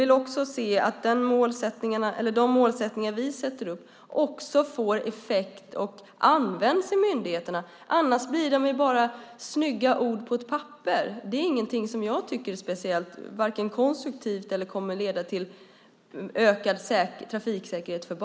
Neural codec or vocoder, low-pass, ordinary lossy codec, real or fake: none; none; none; real